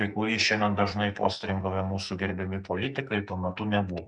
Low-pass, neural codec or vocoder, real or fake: 9.9 kHz; codec, 44.1 kHz, 2.6 kbps, SNAC; fake